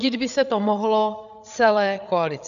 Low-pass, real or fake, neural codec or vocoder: 7.2 kHz; fake; codec, 16 kHz, 8 kbps, FreqCodec, larger model